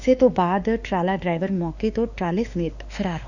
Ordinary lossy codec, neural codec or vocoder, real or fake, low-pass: none; autoencoder, 48 kHz, 32 numbers a frame, DAC-VAE, trained on Japanese speech; fake; 7.2 kHz